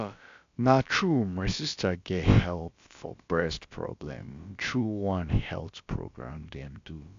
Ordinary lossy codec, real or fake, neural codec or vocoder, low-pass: AAC, 64 kbps; fake; codec, 16 kHz, about 1 kbps, DyCAST, with the encoder's durations; 7.2 kHz